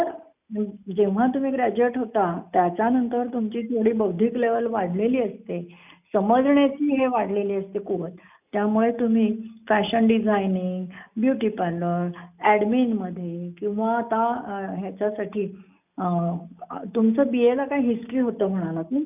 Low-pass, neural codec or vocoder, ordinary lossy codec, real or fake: 3.6 kHz; none; none; real